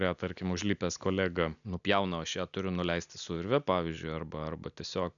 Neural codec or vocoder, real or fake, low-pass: none; real; 7.2 kHz